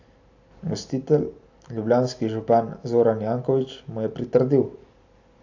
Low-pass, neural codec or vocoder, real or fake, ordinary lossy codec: 7.2 kHz; none; real; AAC, 48 kbps